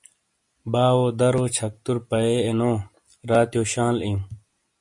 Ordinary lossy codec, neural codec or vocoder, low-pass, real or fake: MP3, 48 kbps; none; 10.8 kHz; real